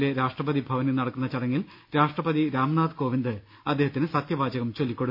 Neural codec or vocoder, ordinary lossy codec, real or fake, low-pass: none; none; real; 5.4 kHz